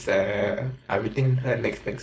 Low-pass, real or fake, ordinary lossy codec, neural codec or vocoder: none; fake; none; codec, 16 kHz, 4.8 kbps, FACodec